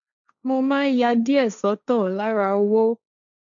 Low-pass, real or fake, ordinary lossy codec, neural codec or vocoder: 7.2 kHz; fake; none; codec, 16 kHz, 1.1 kbps, Voila-Tokenizer